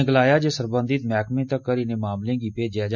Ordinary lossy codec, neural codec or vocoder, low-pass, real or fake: none; none; 7.2 kHz; real